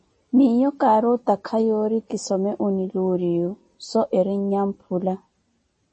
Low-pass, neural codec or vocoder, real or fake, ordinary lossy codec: 10.8 kHz; none; real; MP3, 32 kbps